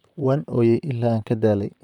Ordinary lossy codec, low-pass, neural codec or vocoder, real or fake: none; 19.8 kHz; vocoder, 44.1 kHz, 128 mel bands, Pupu-Vocoder; fake